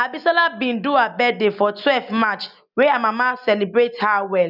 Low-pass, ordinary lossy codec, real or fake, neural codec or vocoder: 5.4 kHz; none; real; none